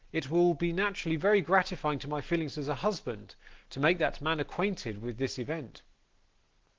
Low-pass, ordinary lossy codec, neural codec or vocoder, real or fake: 7.2 kHz; Opus, 16 kbps; none; real